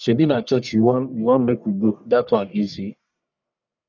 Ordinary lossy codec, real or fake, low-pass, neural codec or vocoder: none; fake; 7.2 kHz; codec, 44.1 kHz, 1.7 kbps, Pupu-Codec